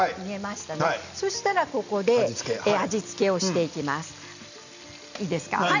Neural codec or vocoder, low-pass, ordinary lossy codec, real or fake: none; 7.2 kHz; none; real